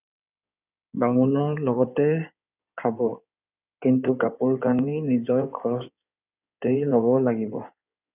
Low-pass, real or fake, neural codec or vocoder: 3.6 kHz; fake; codec, 16 kHz in and 24 kHz out, 2.2 kbps, FireRedTTS-2 codec